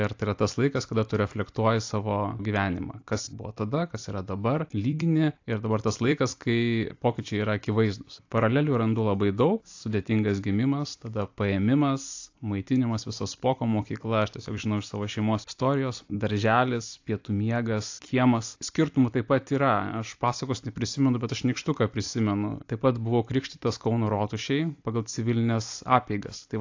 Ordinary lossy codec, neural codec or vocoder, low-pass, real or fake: AAC, 48 kbps; vocoder, 44.1 kHz, 128 mel bands every 256 samples, BigVGAN v2; 7.2 kHz; fake